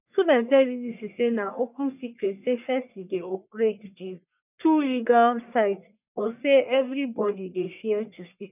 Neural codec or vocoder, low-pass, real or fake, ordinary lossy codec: codec, 44.1 kHz, 1.7 kbps, Pupu-Codec; 3.6 kHz; fake; none